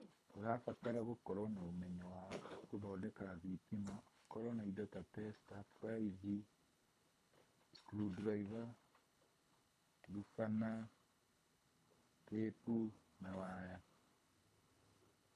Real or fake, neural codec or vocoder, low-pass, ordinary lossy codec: fake; codec, 24 kHz, 3 kbps, HILCodec; none; none